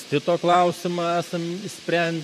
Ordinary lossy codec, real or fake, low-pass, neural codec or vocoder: MP3, 64 kbps; fake; 14.4 kHz; vocoder, 44.1 kHz, 128 mel bands, Pupu-Vocoder